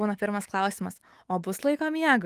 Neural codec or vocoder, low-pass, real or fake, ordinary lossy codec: autoencoder, 48 kHz, 128 numbers a frame, DAC-VAE, trained on Japanese speech; 14.4 kHz; fake; Opus, 24 kbps